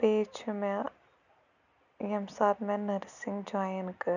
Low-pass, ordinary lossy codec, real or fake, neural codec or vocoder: 7.2 kHz; none; real; none